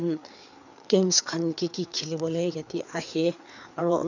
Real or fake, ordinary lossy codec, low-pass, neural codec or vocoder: fake; none; 7.2 kHz; codec, 16 kHz, 4 kbps, FreqCodec, larger model